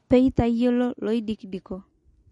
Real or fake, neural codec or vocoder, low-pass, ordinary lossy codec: real; none; 19.8 kHz; MP3, 48 kbps